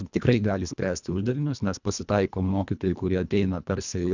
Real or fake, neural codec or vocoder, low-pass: fake; codec, 24 kHz, 1.5 kbps, HILCodec; 7.2 kHz